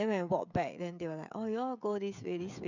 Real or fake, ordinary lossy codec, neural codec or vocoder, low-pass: fake; none; codec, 16 kHz, 16 kbps, FreqCodec, smaller model; 7.2 kHz